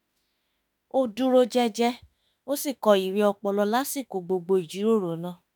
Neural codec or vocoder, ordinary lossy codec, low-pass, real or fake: autoencoder, 48 kHz, 32 numbers a frame, DAC-VAE, trained on Japanese speech; none; none; fake